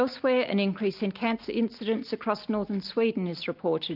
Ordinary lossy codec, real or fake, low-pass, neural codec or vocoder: Opus, 24 kbps; real; 5.4 kHz; none